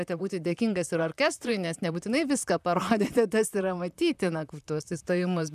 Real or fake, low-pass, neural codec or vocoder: fake; 14.4 kHz; vocoder, 44.1 kHz, 128 mel bands, Pupu-Vocoder